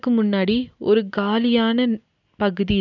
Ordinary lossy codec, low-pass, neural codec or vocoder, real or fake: none; 7.2 kHz; none; real